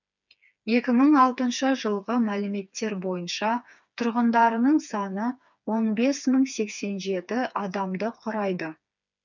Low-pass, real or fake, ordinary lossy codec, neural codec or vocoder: 7.2 kHz; fake; none; codec, 16 kHz, 4 kbps, FreqCodec, smaller model